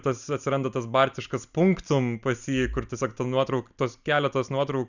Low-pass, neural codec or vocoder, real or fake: 7.2 kHz; none; real